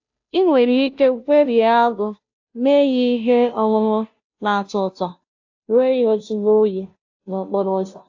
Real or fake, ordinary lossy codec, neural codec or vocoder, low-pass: fake; AAC, 48 kbps; codec, 16 kHz, 0.5 kbps, FunCodec, trained on Chinese and English, 25 frames a second; 7.2 kHz